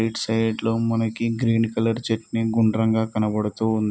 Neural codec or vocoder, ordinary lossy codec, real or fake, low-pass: none; none; real; none